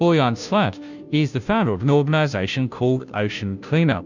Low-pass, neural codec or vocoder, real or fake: 7.2 kHz; codec, 16 kHz, 0.5 kbps, FunCodec, trained on Chinese and English, 25 frames a second; fake